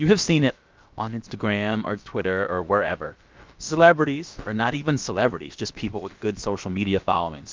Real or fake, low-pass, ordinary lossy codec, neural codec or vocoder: fake; 7.2 kHz; Opus, 24 kbps; codec, 16 kHz, about 1 kbps, DyCAST, with the encoder's durations